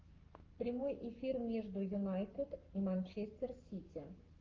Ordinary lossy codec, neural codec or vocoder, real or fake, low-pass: Opus, 32 kbps; codec, 44.1 kHz, 7.8 kbps, Pupu-Codec; fake; 7.2 kHz